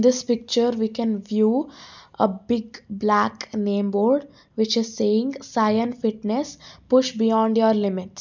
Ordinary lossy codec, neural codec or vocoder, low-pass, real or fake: none; none; 7.2 kHz; real